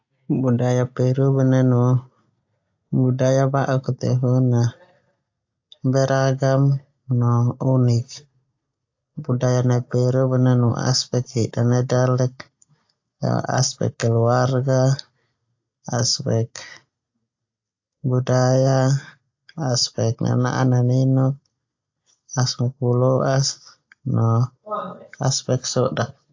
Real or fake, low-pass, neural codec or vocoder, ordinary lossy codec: real; 7.2 kHz; none; AAC, 48 kbps